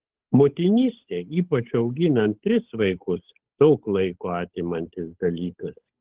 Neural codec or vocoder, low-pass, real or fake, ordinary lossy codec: codec, 16 kHz, 8 kbps, FunCodec, trained on Chinese and English, 25 frames a second; 3.6 kHz; fake; Opus, 16 kbps